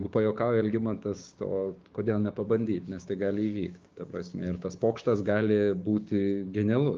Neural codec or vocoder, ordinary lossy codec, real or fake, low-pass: codec, 16 kHz, 6 kbps, DAC; Opus, 24 kbps; fake; 7.2 kHz